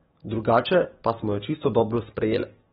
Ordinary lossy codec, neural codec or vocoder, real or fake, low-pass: AAC, 16 kbps; codec, 44.1 kHz, 7.8 kbps, DAC; fake; 19.8 kHz